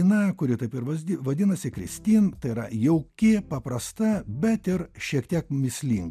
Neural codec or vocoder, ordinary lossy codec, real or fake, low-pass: none; MP3, 96 kbps; real; 14.4 kHz